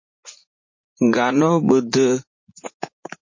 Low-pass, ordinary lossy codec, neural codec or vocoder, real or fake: 7.2 kHz; MP3, 32 kbps; vocoder, 44.1 kHz, 80 mel bands, Vocos; fake